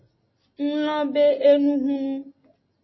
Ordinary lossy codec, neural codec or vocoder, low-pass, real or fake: MP3, 24 kbps; none; 7.2 kHz; real